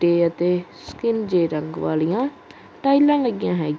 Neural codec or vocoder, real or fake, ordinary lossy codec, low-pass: none; real; none; none